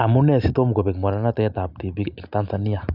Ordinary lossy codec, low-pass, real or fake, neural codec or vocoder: none; 5.4 kHz; real; none